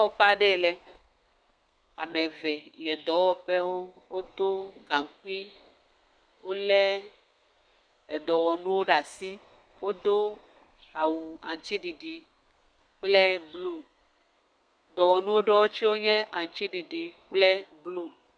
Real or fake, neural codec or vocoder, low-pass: fake; codec, 32 kHz, 1.9 kbps, SNAC; 9.9 kHz